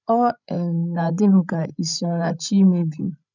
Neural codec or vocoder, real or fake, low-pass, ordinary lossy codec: codec, 16 kHz, 16 kbps, FreqCodec, larger model; fake; 7.2 kHz; none